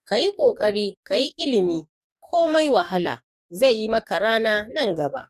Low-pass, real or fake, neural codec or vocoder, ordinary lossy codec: 14.4 kHz; fake; codec, 44.1 kHz, 2.6 kbps, DAC; none